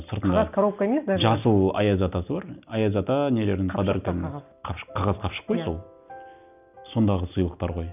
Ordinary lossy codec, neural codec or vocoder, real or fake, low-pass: none; none; real; 3.6 kHz